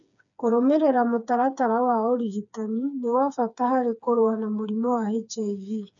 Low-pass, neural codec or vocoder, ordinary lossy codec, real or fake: 7.2 kHz; codec, 16 kHz, 4 kbps, FreqCodec, smaller model; none; fake